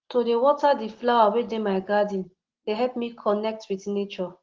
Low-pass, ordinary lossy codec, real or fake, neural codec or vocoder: 7.2 kHz; Opus, 16 kbps; real; none